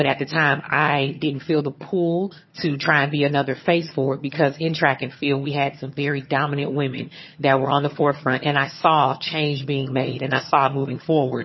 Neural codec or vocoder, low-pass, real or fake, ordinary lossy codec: vocoder, 22.05 kHz, 80 mel bands, HiFi-GAN; 7.2 kHz; fake; MP3, 24 kbps